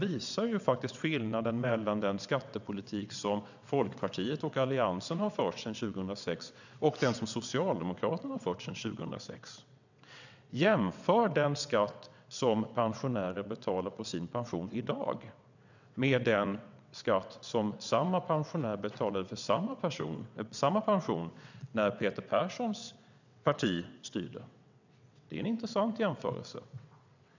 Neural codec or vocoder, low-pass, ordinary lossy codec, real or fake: vocoder, 22.05 kHz, 80 mel bands, WaveNeXt; 7.2 kHz; none; fake